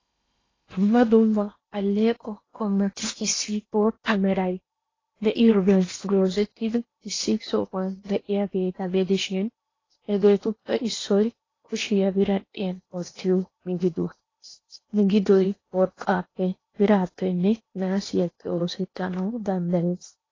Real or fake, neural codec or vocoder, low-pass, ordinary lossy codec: fake; codec, 16 kHz in and 24 kHz out, 0.8 kbps, FocalCodec, streaming, 65536 codes; 7.2 kHz; AAC, 32 kbps